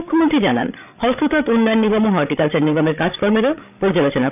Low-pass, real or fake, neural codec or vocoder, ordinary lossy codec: 3.6 kHz; fake; codec, 16 kHz, 16 kbps, FreqCodec, larger model; none